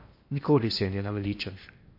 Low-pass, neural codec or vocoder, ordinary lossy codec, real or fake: 5.4 kHz; codec, 16 kHz in and 24 kHz out, 0.8 kbps, FocalCodec, streaming, 65536 codes; MP3, 32 kbps; fake